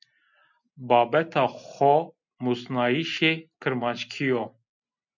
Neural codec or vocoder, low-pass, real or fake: none; 7.2 kHz; real